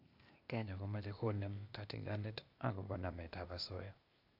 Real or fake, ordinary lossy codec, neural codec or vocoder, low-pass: fake; AAC, 48 kbps; codec, 16 kHz, 0.8 kbps, ZipCodec; 5.4 kHz